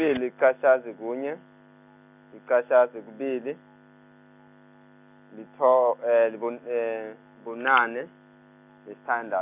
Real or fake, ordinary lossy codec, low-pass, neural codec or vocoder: real; none; 3.6 kHz; none